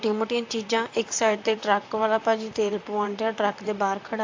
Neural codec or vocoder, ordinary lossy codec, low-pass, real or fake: vocoder, 44.1 kHz, 128 mel bands, Pupu-Vocoder; AAC, 48 kbps; 7.2 kHz; fake